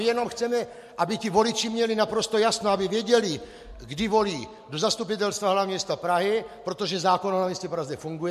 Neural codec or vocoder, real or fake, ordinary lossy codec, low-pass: none; real; MP3, 64 kbps; 14.4 kHz